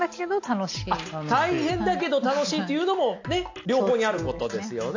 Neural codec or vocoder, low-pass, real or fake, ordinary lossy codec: none; 7.2 kHz; real; AAC, 48 kbps